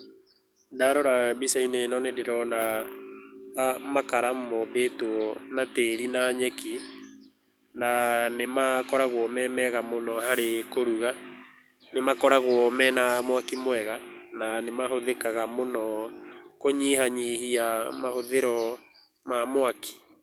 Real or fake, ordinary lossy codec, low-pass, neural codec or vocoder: fake; none; none; codec, 44.1 kHz, 7.8 kbps, DAC